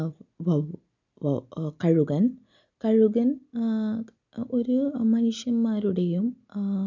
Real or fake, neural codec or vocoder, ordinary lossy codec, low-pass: real; none; none; 7.2 kHz